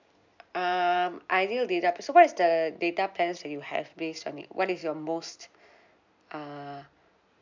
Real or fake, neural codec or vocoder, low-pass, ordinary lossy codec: real; none; 7.2 kHz; MP3, 64 kbps